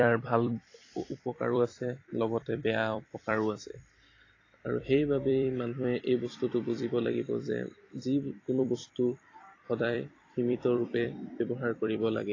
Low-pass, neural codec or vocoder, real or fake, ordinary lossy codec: 7.2 kHz; vocoder, 44.1 kHz, 128 mel bands every 256 samples, BigVGAN v2; fake; AAC, 32 kbps